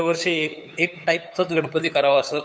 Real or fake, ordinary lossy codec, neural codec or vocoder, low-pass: fake; none; codec, 16 kHz, 8 kbps, FunCodec, trained on LibriTTS, 25 frames a second; none